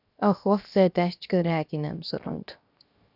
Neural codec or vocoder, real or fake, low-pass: codec, 16 kHz, 0.7 kbps, FocalCodec; fake; 5.4 kHz